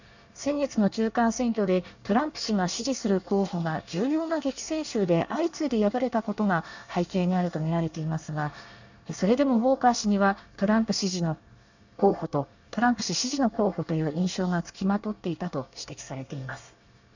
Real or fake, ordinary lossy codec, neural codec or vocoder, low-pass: fake; none; codec, 24 kHz, 1 kbps, SNAC; 7.2 kHz